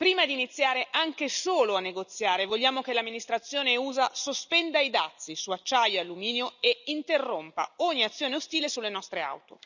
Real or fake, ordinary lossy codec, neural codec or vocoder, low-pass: real; none; none; 7.2 kHz